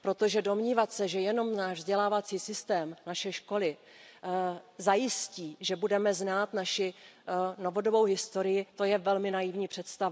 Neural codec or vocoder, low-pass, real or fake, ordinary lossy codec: none; none; real; none